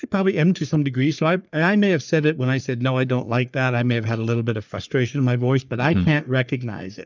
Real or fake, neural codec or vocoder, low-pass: fake; codec, 44.1 kHz, 3.4 kbps, Pupu-Codec; 7.2 kHz